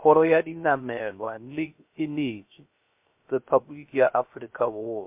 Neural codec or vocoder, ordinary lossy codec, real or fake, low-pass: codec, 16 kHz, 0.3 kbps, FocalCodec; MP3, 32 kbps; fake; 3.6 kHz